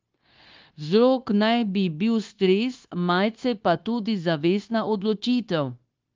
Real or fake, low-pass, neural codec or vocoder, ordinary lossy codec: fake; 7.2 kHz; codec, 16 kHz, 0.9 kbps, LongCat-Audio-Codec; Opus, 32 kbps